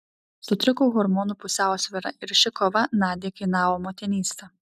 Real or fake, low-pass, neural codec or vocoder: real; 14.4 kHz; none